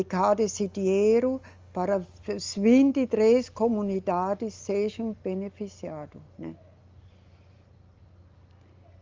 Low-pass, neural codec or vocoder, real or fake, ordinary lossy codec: 7.2 kHz; none; real; Opus, 32 kbps